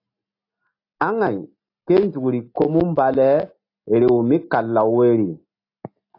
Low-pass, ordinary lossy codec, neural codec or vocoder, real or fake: 5.4 kHz; AAC, 32 kbps; none; real